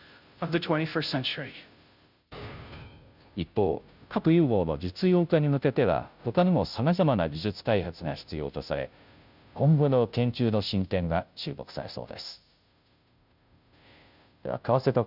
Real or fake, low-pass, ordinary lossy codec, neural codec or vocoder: fake; 5.4 kHz; none; codec, 16 kHz, 0.5 kbps, FunCodec, trained on Chinese and English, 25 frames a second